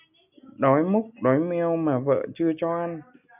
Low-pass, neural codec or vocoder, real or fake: 3.6 kHz; none; real